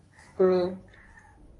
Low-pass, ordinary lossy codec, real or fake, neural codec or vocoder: 10.8 kHz; AAC, 32 kbps; fake; codec, 24 kHz, 0.9 kbps, WavTokenizer, medium speech release version 1